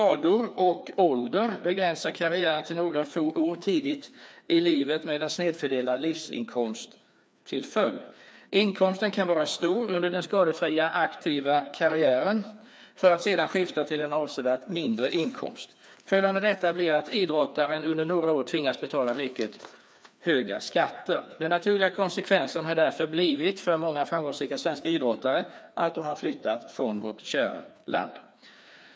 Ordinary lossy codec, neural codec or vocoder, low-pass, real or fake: none; codec, 16 kHz, 2 kbps, FreqCodec, larger model; none; fake